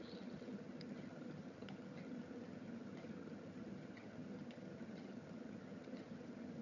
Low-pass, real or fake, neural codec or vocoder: 7.2 kHz; fake; vocoder, 22.05 kHz, 80 mel bands, HiFi-GAN